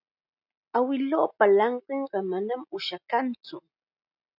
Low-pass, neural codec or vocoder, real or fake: 5.4 kHz; none; real